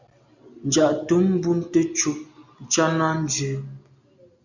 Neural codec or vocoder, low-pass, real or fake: none; 7.2 kHz; real